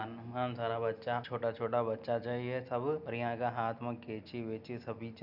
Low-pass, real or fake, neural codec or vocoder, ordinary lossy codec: 5.4 kHz; real; none; none